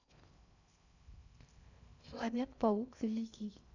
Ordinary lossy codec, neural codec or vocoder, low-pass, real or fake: none; codec, 16 kHz in and 24 kHz out, 0.6 kbps, FocalCodec, streaming, 2048 codes; 7.2 kHz; fake